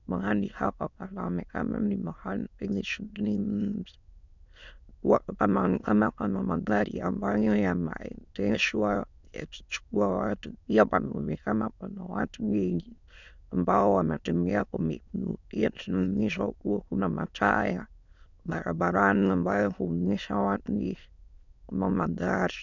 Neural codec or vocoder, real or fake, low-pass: autoencoder, 22.05 kHz, a latent of 192 numbers a frame, VITS, trained on many speakers; fake; 7.2 kHz